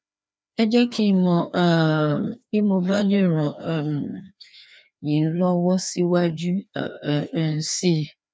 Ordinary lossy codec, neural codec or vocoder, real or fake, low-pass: none; codec, 16 kHz, 2 kbps, FreqCodec, larger model; fake; none